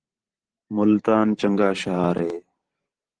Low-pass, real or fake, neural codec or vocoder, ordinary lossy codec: 9.9 kHz; fake; vocoder, 44.1 kHz, 128 mel bands every 512 samples, BigVGAN v2; Opus, 16 kbps